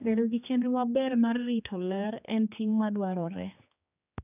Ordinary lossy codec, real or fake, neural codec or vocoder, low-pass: none; fake; codec, 16 kHz, 2 kbps, X-Codec, HuBERT features, trained on general audio; 3.6 kHz